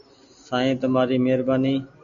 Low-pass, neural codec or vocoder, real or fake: 7.2 kHz; none; real